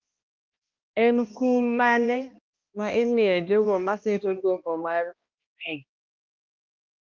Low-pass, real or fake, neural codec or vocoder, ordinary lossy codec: 7.2 kHz; fake; codec, 16 kHz, 1 kbps, X-Codec, HuBERT features, trained on balanced general audio; Opus, 24 kbps